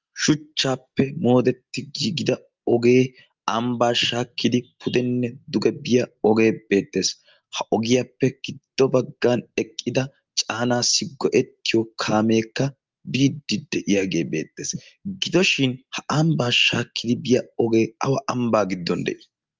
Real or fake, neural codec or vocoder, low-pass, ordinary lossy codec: real; none; 7.2 kHz; Opus, 24 kbps